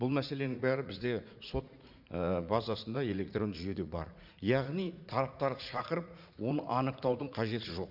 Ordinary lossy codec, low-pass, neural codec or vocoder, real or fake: Opus, 64 kbps; 5.4 kHz; vocoder, 44.1 kHz, 80 mel bands, Vocos; fake